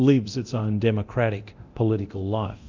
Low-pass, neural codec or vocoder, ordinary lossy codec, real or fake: 7.2 kHz; codec, 24 kHz, 0.9 kbps, DualCodec; MP3, 64 kbps; fake